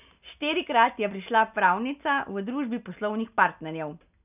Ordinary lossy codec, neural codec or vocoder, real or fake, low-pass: none; none; real; 3.6 kHz